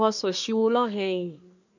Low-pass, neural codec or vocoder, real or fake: 7.2 kHz; codec, 24 kHz, 1 kbps, SNAC; fake